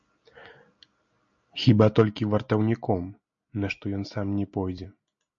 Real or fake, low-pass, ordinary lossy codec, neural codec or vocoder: real; 7.2 kHz; MP3, 48 kbps; none